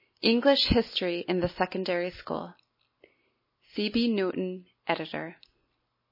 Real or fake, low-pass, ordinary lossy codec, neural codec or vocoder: real; 5.4 kHz; MP3, 24 kbps; none